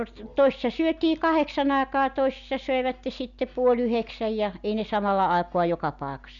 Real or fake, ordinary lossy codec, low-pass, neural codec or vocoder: real; none; 7.2 kHz; none